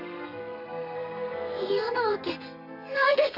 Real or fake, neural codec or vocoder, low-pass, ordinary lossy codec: fake; codec, 32 kHz, 1.9 kbps, SNAC; 5.4 kHz; none